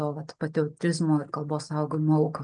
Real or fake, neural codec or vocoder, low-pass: real; none; 9.9 kHz